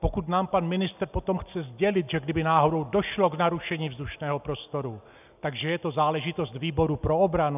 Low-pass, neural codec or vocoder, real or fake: 3.6 kHz; none; real